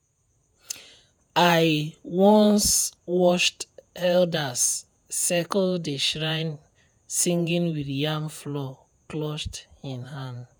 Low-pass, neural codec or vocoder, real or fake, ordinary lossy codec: none; vocoder, 48 kHz, 128 mel bands, Vocos; fake; none